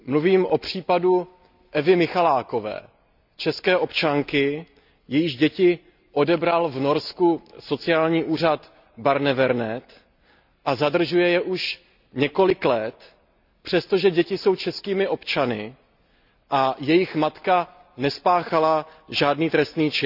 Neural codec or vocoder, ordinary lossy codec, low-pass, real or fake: none; none; 5.4 kHz; real